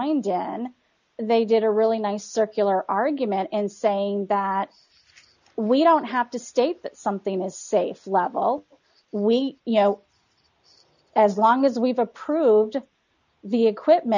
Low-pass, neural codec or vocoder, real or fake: 7.2 kHz; none; real